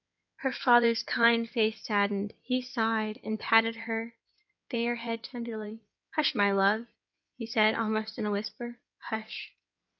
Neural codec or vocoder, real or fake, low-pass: codec, 16 kHz in and 24 kHz out, 2.2 kbps, FireRedTTS-2 codec; fake; 7.2 kHz